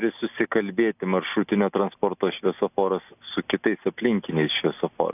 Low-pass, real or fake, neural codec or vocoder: 3.6 kHz; real; none